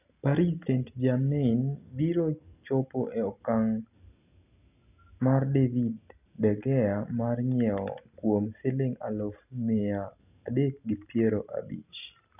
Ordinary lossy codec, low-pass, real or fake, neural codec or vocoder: none; 3.6 kHz; real; none